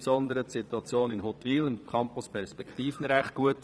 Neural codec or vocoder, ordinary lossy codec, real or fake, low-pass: vocoder, 22.05 kHz, 80 mel bands, Vocos; none; fake; none